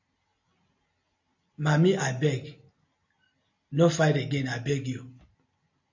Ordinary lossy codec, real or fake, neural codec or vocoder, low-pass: AAC, 48 kbps; real; none; 7.2 kHz